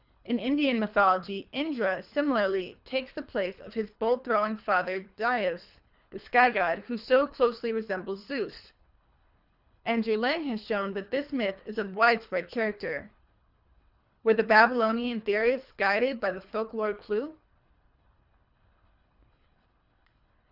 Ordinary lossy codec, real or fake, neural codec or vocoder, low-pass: Opus, 64 kbps; fake; codec, 24 kHz, 3 kbps, HILCodec; 5.4 kHz